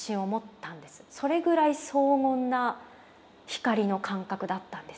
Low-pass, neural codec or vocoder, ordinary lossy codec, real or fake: none; none; none; real